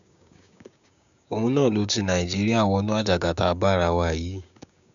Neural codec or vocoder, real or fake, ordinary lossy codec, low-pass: codec, 16 kHz, 6 kbps, DAC; fake; none; 7.2 kHz